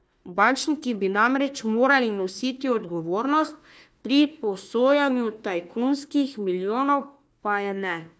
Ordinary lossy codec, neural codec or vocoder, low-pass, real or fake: none; codec, 16 kHz, 1 kbps, FunCodec, trained on Chinese and English, 50 frames a second; none; fake